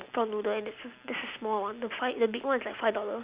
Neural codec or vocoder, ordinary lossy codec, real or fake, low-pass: none; Opus, 64 kbps; real; 3.6 kHz